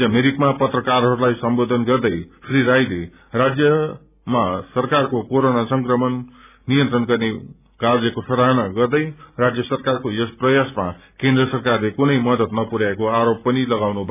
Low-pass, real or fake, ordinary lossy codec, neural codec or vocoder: 3.6 kHz; real; none; none